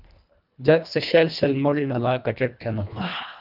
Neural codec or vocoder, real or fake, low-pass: codec, 24 kHz, 1.5 kbps, HILCodec; fake; 5.4 kHz